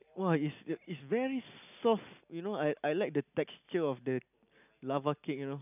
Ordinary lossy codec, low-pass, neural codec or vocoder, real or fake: none; 3.6 kHz; none; real